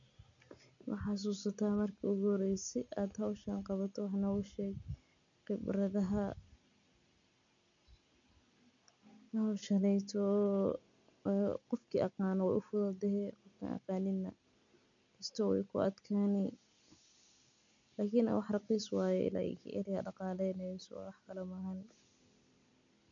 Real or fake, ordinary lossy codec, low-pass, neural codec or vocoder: real; none; 7.2 kHz; none